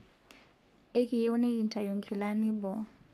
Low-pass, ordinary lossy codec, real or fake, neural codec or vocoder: 14.4 kHz; none; fake; codec, 44.1 kHz, 3.4 kbps, Pupu-Codec